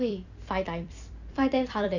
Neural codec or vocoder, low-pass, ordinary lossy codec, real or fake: vocoder, 44.1 kHz, 128 mel bands every 256 samples, BigVGAN v2; 7.2 kHz; none; fake